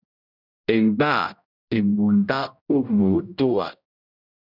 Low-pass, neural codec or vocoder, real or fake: 5.4 kHz; codec, 16 kHz, 0.5 kbps, X-Codec, HuBERT features, trained on general audio; fake